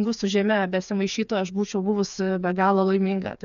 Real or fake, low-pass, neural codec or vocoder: fake; 7.2 kHz; codec, 16 kHz, 4 kbps, FreqCodec, smaller model